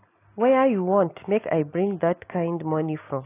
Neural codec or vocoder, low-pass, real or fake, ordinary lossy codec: none; 3.6 kHz; real; AAC, 24 kbps